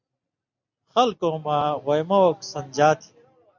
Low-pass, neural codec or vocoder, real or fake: 7.2 kHz; none; real